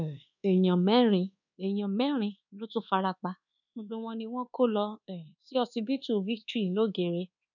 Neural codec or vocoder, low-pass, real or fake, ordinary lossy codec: codec, 24 kHz, 1.2 kbps, DualCodec; 7.2 kHz; fake; none